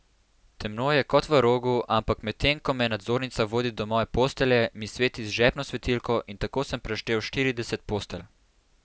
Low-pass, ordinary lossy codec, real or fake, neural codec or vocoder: none; none; real; none